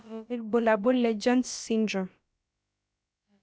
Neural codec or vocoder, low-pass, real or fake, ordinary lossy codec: codec, 16 kHz, about 1 kbps, DyCAST, with the encoder's durations; none; fake; none